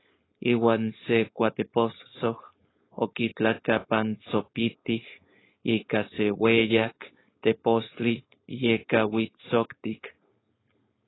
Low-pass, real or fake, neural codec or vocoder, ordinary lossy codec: 7.2 kHz; fake; codec, 16 kHz, 4.8 kbps, FACodec; AAC, 16 kbps